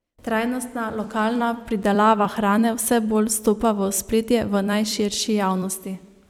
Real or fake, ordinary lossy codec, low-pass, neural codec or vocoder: fake; none; 19.8 kHz; vocoder, 44.1 kHz, 128 mel bands every 256 samples, BigVGAN v2